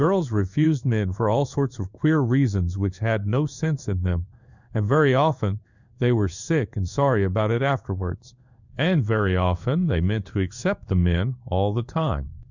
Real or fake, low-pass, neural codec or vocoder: fake; 7.2 kHz; codec, 16 kHz in and 24 kHz out, 1 kbps, XY-Tokenizer